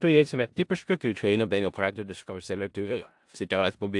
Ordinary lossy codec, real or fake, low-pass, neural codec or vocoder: AAC, 64 kbps; fake; 10.8 kHz; codec, 16 kHz in and 24 kHz out, 0.4 kbps, LongCat-Audio-Codec, four codebook decoder